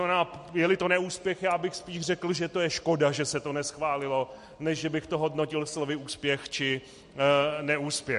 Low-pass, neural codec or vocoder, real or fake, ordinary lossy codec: 14.4 kHz; none; real; MP3, 48 kbps